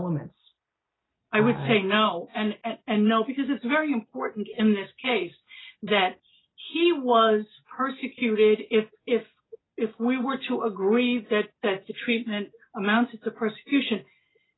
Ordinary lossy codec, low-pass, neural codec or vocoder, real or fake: AAC, 16 kbps; 7.2 kHz; none; real